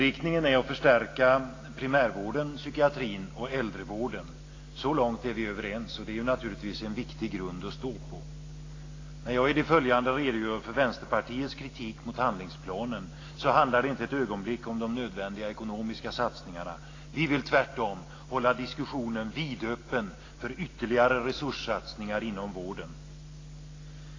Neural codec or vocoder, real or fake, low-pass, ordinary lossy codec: none; real; 7.2 kHz; AAC, 32 kbps